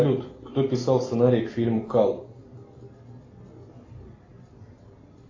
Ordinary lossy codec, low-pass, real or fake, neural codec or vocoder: AAC, 32 kbps; 7.2 kHz; real; none